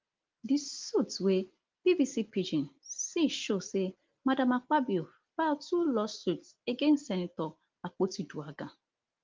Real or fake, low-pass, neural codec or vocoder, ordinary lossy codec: real; 7.2 kHz; none; Opus, 32 kbps